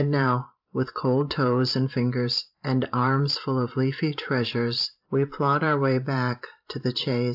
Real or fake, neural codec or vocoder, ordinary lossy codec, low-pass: fake; vocoder, 44.1 kHz, 128 mel bands every 512 samples, BigVGAN v2; AAC, 48 kbps; 5.4 kHz